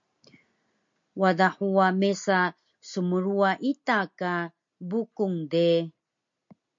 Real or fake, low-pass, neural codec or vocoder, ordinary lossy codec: real; 7.2 kHz; none; MP3, 48 kbps